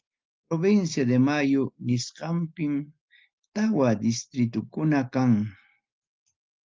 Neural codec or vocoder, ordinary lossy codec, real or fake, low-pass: none; Opus, 32 kbps; real; 7.2 kHz